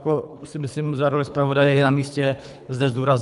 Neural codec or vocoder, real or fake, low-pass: codec, 24 kHz, 3 kbps, HILCodec; fake; 10.8 kHz